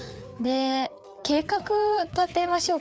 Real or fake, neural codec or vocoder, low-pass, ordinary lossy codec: fake; codec, 16 kHz, 4 kbps, FreqCodec, larger model; none; none